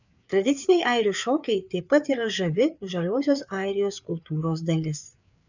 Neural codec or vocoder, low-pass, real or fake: codec, 16 kHz, 4 kbps, FreqCodec, larger model; 7.2 kHz; fake